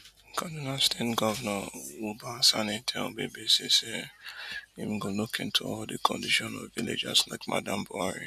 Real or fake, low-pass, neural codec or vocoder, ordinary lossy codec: real; 14.4 kHz; none; none